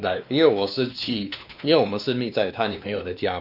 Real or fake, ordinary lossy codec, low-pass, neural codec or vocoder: fake; none; 5.4 kHz; codec, 16 kHz, 2 kbps, X-Codec, WavLM features, trained on Multilingual LibriSpeech